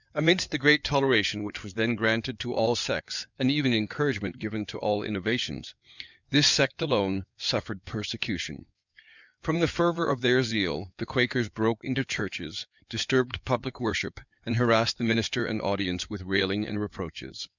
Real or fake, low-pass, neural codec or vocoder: fake; 7.2 kHz; codec, 16 kHz in and 24 kHz out, 2.2 kbps, FireRedTTS-2 codec